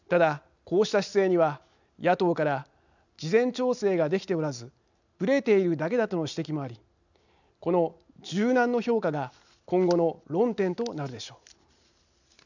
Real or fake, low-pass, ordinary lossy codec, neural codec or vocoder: real; 7.2 kHz; none; none